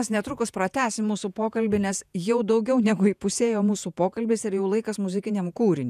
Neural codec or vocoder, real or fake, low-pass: vocoder, 48 kHz, 128 mel bands, Vocos; fake; 14.4 kHz